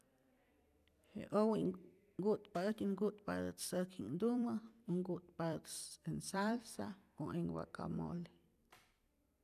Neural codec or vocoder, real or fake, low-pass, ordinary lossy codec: vocoder, 44.1 kHz, 128 mel bands every 256 samples, BigVGAN v2; fake; 14.4 kHz; none